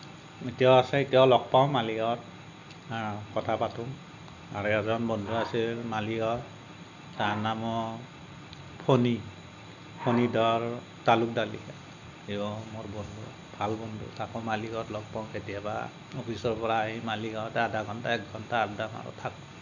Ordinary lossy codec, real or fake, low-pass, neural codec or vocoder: none; real; 7.2 kHz; none